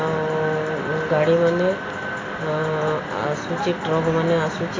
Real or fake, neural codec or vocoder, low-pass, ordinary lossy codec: real; none; 7.2 kHz; AAC, 32 kbps